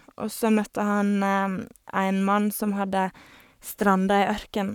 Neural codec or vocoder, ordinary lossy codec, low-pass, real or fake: codec, 44.1 kHz, 7.8 kbps, Pupu-Codec; none; 19.8 kHz; fake